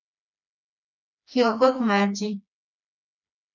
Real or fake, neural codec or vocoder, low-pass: fake; codec, 16 kHz, 2 kbps, FreqCodec, smaller model; 7.2 kHz